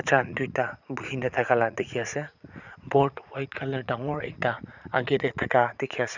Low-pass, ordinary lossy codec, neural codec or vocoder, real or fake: 7.2 kHz; none; vocoder, 44.1 kHz, 80 mel bands, Vocos; fake